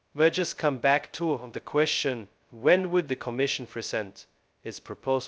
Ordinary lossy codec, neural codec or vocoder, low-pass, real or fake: none; codec, 16 kHz, 0.2 kbps, FocalCodec; none; fake